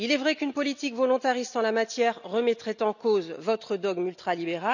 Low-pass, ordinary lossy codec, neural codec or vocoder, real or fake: 7.2 kHz; none; none; real